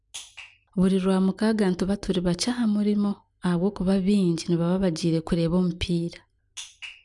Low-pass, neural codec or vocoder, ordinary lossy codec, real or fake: 10.8 kHz; none; none; real